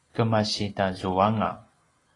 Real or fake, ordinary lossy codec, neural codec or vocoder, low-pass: real; AAC, 32 kbps; none; 10.8 kHz